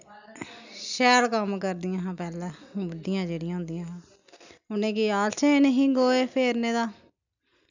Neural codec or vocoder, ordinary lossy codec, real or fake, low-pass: none; none; real; 7.2 kHz